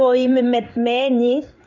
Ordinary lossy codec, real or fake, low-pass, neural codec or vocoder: none; fake; 7.2 kHz; codec, 16 kHz in and 24 kHz out, 1 kbps, XY-Tokenizer